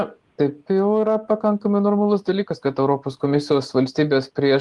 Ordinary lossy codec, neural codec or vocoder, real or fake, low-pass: Opus, 24 kbps; none; real; 10.8 kHz